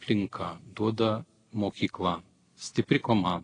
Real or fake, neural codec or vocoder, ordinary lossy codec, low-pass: real; none; AAC, 32 kbps; 9.9 kHz